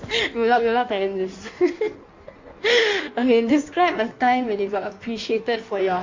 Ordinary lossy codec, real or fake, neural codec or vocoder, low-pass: MP3, 48 kbps; fake; codec, 16 kHz in and 24 kHz out, 1.1 kbps, FireRedTTS-2 codec; 7.2 kHz